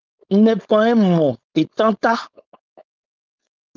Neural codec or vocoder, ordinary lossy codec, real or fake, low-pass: codec, 16 kHz, 4.8 kbps, FACodec; Opus, 24 kbps; fake; 7.2 kHz